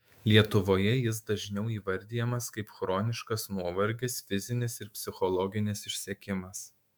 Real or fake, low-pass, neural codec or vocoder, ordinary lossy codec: fake; 19.8 kHz; autoencoder, 48 kHz, 128 numbers a frame, DAC-VAE, trained on Japanese speech; MP3, 96 kbps